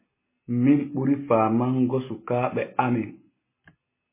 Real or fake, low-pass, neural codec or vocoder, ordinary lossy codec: real; 3.6 kHz; none; MP3, 16 kbps